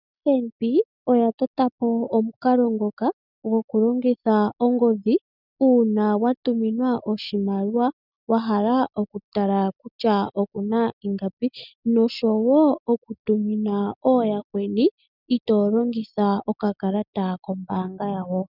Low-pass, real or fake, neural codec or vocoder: 5.4 kHz; real; none